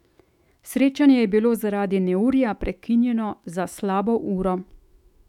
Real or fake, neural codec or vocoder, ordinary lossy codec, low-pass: fake; autoencoder, 48 kHz, 128 numbers a frame, DAC-VAE, trained on Japanese speech; none; 19.8 kHz